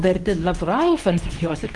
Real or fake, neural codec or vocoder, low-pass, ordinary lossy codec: fake; codec, 24 kHz, 0.9 kbps, WavTokenizer, medium speech release version 2; 10.8 kHz; Opus, 24 kbps